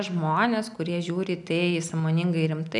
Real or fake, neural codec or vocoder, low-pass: real; none; 10.8 kHz